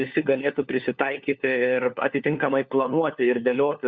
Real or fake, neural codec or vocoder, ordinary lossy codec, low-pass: fake; codec, 16 kHz, 4 kbps, FunCodec, trained on LibriTTS, 50 frames a second; Opus, 64 kbps; 7.2 kHz